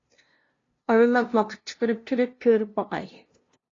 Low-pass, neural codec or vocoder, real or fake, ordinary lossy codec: 7.2 kHz; codec, 16 kHz, 0.5 kbps, FunCodec, trained on LibriTTS, 25 frames a second; fake; AAC, 32 kbps